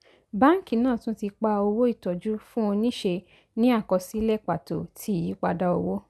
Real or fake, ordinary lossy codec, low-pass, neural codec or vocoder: real; none; none; none